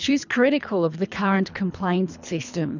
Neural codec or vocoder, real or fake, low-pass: codec, 24 kHz, 3 kbps, HILCodec; fake; 7.2 kHz